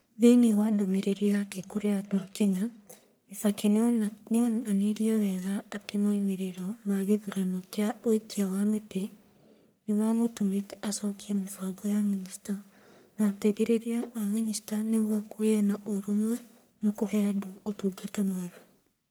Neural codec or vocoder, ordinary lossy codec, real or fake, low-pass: codec, 44.1 kHz, 1.7 kbps, Pupu-Codec; none; fake; none